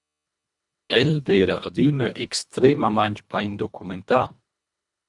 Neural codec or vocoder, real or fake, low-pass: codec, 24 kHz, 1.5 kbps, HILCodec; fake; 10.8 kHz